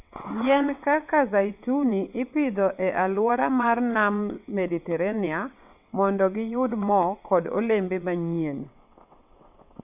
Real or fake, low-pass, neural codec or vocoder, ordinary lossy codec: fake; 3.6 kHz; vocoder, 22.05 kHz, 80 mel bands, WaveNeXt; none